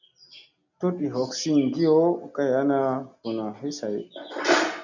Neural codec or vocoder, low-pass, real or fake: none; 7.2 kHz; real